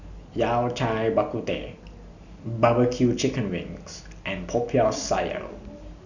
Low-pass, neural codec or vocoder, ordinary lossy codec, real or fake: 7.2 kHz; none; none; real